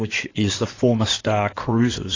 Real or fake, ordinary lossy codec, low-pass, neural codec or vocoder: fake; AAC, 32 kbps; 7.2 kHz; codec, 16 kHz in and 24 kHz out, 2.2 kbps, FireRedTTS-2 codec